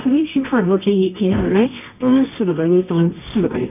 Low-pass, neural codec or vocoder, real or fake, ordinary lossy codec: 3.6 kHz; codec, 24 kHz, 0.9 kbps, WavTokenizer, medium music audio release; fake; none